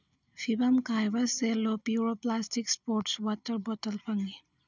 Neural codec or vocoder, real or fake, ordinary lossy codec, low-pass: none; real; none; 7.2 kHz